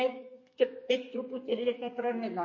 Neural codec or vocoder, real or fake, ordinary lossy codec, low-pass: codec, 32 kHz, 1.9 kbps, SNAC; fake; MP3, 32 kbps; 7.2 kHz